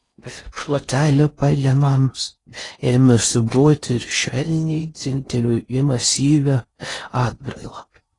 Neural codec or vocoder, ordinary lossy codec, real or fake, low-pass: codec, 16 kHz in and 24 kHz out, 0.6 kbps, FocalCodec, streaming, 4096 codes; AAC, 32 kbps; fake; 10.8 kHz